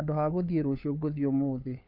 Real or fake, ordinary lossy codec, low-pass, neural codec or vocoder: fake; none; 5.4 kHz; codec, 16 kHz, 4 kbps, FunCodec, trained on LibriTTS, 50 frames a second